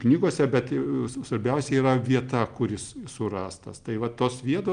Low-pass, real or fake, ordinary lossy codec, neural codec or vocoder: 9.9 kHz; real; Opus, 32 kbps; none